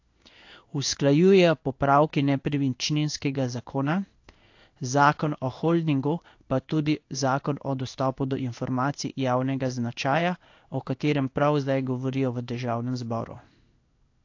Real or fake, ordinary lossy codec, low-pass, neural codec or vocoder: fake; AAC, 48 kbps; 7.2 kHz; codec, 16 kHz in and 24 kHz out, 1 kbps, XY-Tokenizer